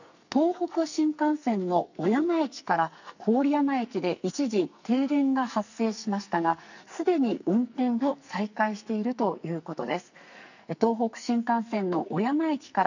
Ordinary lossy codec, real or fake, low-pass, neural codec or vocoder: none; fake; 7.2 kHz; codec, 44.1 kHz, 2.6 kbps, SNAC